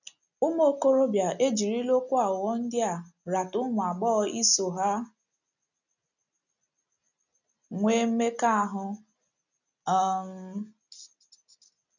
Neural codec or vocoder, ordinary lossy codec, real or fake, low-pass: none; none; real; 7.2 kHz